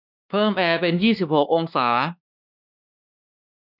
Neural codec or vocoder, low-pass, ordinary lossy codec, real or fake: codec, 16 kHz, 2 kbps, X-Codec, WavLM features, trained on Multilingual LibriSpeech; 5.4 kHz; none; fake